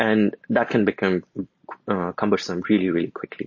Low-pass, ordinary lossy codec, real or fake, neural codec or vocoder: 7.2 kHz; MP3, 32 kbps; real; none